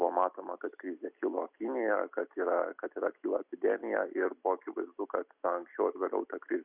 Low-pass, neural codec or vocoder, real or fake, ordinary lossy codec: 3.6 kHz; none; real; Opus, 64 kbps